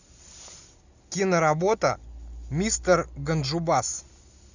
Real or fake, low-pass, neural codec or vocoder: real; 7.2 kHz; none